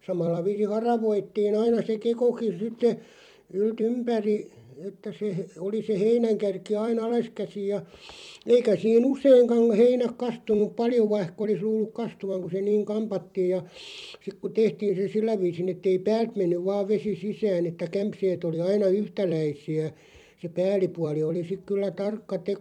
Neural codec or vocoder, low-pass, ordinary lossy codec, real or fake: vocoder, 44.1 kHz, 128 mel bands every 256 samples, BigVGAN v2; 19.8 kHz; MP3, 96 kbps; fake